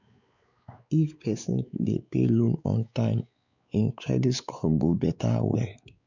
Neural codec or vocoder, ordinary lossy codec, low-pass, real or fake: codec, 16 kHz, 4 kbps, X-Codec, WavLM features, trained on Multilingual LibriSpeech; none; 7.2 kHz; fake